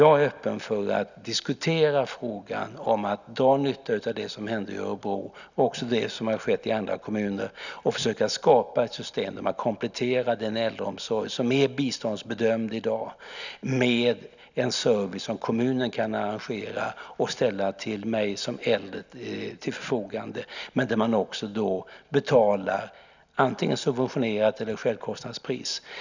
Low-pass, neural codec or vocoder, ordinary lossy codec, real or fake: 7.2 kHz; none; none; real